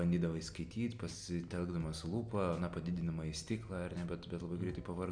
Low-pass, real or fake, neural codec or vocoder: 9.9 kHz; real; none